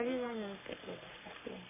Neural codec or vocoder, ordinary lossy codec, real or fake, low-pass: codec, 44.1 kHz, 3.4 kbps, Pupu-Codec; MP3, 24 kbps; fake; 3.6 kHz